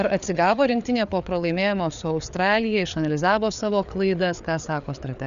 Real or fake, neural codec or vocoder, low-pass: fake; codec, 16 kHz, 4 kbps, FreqCodec, larger model; 7.2 kHz